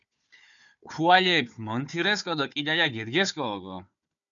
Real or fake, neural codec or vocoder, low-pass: fake; codec, 16 kHz, 4 kbps, FunCodec, trained on Chinese and English, 50 frames a second; 7.2 kHz